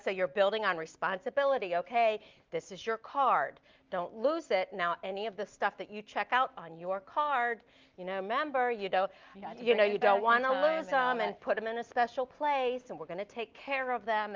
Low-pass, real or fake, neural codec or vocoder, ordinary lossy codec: 7.2 kHz; real; none; Opus, 32 kbps